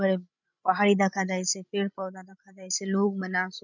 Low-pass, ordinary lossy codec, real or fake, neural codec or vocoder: 7.2 kHz; none; fake; codec, 16 kHz, 16 kbps, FreqCodec, larger model